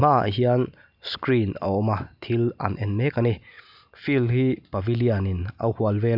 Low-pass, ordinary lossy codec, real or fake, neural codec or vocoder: 5.4 kHz; Opus, 64 kbps; real; none